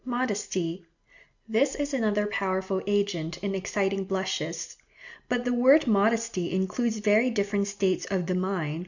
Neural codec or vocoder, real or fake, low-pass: none; real; 7.2 kHz